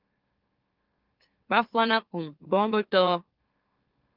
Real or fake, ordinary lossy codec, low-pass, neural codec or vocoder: fake; Opus, 24 kbps; 5.4 kHz; autoencoder, 44.1 kHz, a latent of 192 numbers a frame, MeloTTS